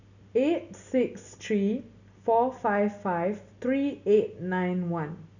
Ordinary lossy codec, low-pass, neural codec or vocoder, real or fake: none; 7.2 kHz; none; real